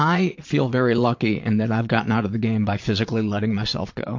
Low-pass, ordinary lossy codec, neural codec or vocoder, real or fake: 7.2 kHz; MP3, 48 kbps; vocoder, 22.05 kHz, 80 mel bands, Vocos; fake